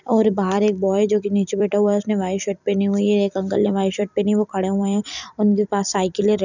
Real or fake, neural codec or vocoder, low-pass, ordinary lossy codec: fake; vocoder, 44.1 kHz, 128 mel bands every 256 samples, BigVGAN v2; 7.2 kHz; none